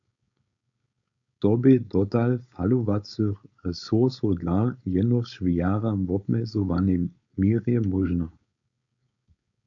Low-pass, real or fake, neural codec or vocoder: 7.2 kHz; fake; codec, 16 kHz, 4.8 kbps, FACodec